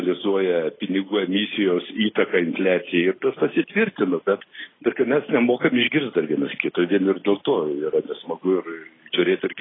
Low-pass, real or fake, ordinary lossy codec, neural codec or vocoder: 7.2 kHz; real; AAC, 16 kbps; none